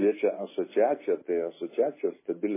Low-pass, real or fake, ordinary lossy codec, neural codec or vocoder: 3.6 kHz; real; MP3, 16 kbps; none